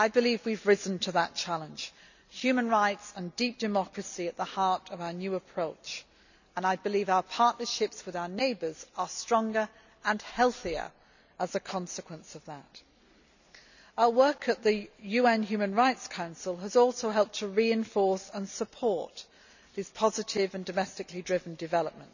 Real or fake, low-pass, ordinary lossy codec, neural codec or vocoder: real; 7.2 kHz; none; none